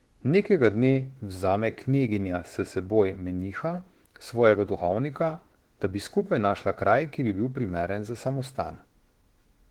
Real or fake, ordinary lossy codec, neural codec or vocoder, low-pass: fake; Opus, 16 kbps; autoencoder, 48 kHz, 32 numbers a frame, DAC-VAE, trained on Japanese speech; 19.8 kHz